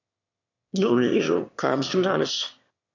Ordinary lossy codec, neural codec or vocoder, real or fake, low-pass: AAC, 48 kbps; autoencoder, 22.05 kHz, a latent of 192 numbers a frame, VITS, trained on one speaker; fake; 7.2 kHz